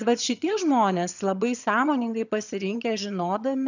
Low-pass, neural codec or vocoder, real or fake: 7.2 kHz; vocoder, 22.05 kHz, 80 mel bands, HiFi-GAN; fake